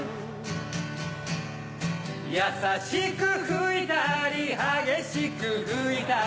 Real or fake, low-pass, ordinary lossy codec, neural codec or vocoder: real; none; none; none